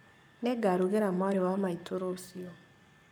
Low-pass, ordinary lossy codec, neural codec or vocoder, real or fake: none; none; codec, 44.1 kHz, 7.8 kbps, Pupu-Codec; fake